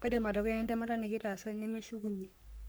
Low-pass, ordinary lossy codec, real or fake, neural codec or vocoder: none; none; fake; codec, 44.1 kHz, 3.4 kbps, Pupu-Codec